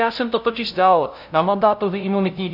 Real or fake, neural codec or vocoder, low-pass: fake; codec, 16 kHz, 0.5 kbps, FunCodec, trained on LibriTTS, 25 frames a second; 5.4 kHz